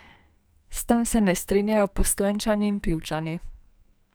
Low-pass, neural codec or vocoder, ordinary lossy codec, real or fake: none; codec, 44.1 kHz, 2.6 kbps, SNAC; none; fake